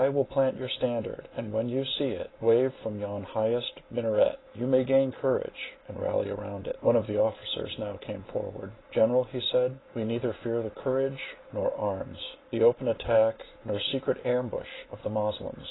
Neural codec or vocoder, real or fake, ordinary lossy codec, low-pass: none; real; AAC, 16 kbps; 7.2 kHz